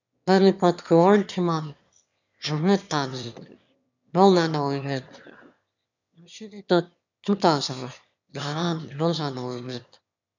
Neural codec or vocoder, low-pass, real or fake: autoencoder, 22.05 kHz, a latent of 192 numbers a frame, VITS, trained on one speaker; 7.2 kHz; fake